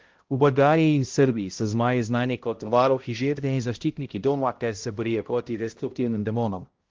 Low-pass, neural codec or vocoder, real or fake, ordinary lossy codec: 7.2 kHz; codec, 16 kHz, 0.5 kbps, X-Codec, HuBERT features, trained on balanced general audio; fake; Opus, 16 kbps